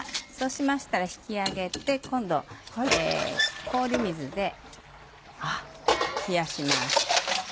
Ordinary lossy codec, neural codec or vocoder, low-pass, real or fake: none; none; none; real